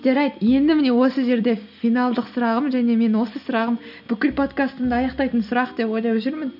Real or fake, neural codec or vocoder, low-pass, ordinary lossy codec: real; none; 5.4 kHz; none